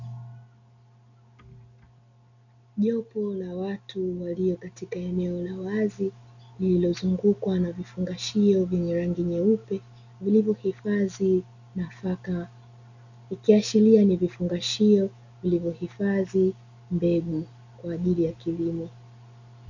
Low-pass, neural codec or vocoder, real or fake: 7.2 kHz; none; real